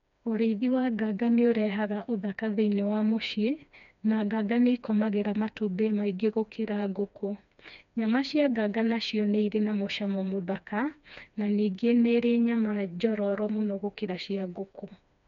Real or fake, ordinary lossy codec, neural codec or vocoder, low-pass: fake; none; codec, 16 kHz, 2 kbps, FreqCodec, smaller model; 7.2 kHz